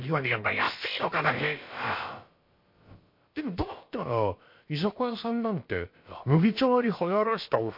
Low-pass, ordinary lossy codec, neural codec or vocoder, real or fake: 5.4 kHz; MP3, 32 kbps; codec, 16 kHz, about 1 kbps, DyCAST, with the encoder's durations; fake